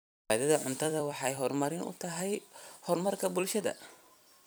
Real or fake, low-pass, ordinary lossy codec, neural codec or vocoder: fake; none; none; vocoder, 44.1 kHz, 128 mel bands every 256 samples, BigVGAN v2